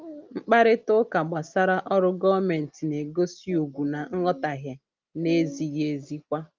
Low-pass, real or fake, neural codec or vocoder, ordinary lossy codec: 7.2 kHz; real; none; Opus, 24 kbps